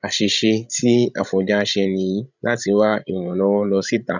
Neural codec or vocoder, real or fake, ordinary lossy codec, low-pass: codec, 16 kHz, 16 kbps, FreqCodec, larger model; fake; none; 7.2 kHz